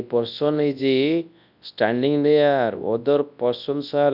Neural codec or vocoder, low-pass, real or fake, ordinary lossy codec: codec, 24 kHz, 0.9 kbps, WavTokenizer, large speech release; 5.4 kHz; fake; none